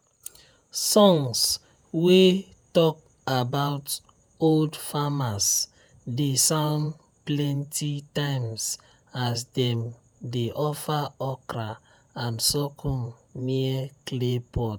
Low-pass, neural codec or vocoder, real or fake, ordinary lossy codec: none; vocoder, 48 kHz, 128 mel bands, Vocos; fake; none